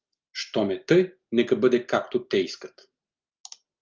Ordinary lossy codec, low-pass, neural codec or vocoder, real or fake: Opus, 24 kbps; 7.2 kHz; none; real